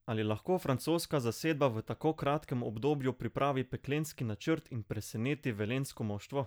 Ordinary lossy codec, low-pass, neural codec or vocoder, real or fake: none; none; none; real